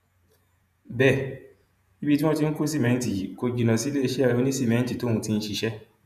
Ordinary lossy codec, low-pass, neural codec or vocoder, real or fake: none; 14.4 kHz; vocoder, 48 kHz, 128 mel bands, Vocos; fake